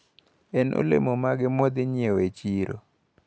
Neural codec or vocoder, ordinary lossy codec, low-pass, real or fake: none; none; none; real